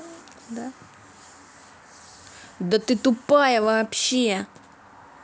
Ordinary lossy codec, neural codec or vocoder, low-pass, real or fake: none; none; none; real